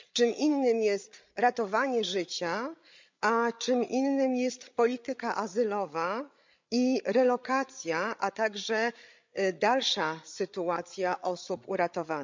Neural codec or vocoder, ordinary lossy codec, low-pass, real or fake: codec, 16 kHz, 8 kbps, FreqCodec, larger model; MP3, 64 kbps; 7.2 kHz; fake